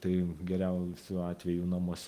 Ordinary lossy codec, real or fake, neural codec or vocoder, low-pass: Opus, 24 kbps; real; none; 14.4 kHz